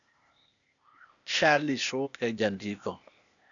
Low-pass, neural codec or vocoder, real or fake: 7.2 kHz; codec, 16 kHz, 0.8 kbps, ZipCodec; fake